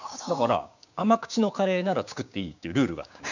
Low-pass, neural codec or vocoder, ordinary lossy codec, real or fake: 7.2 kHz; codec, 16 kHz, 6 kbps, DAC; none; fake